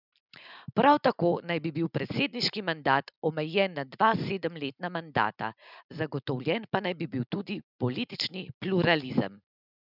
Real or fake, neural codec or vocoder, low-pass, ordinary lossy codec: real; none; 5.4 kHz; none